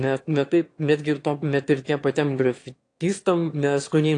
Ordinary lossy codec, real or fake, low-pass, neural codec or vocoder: AAC, 48 kbps; fake; 9.9 kHz; autoencoder, 22.05 kHz, a latent of 192 numbers a frame, VITS, trained on one speaker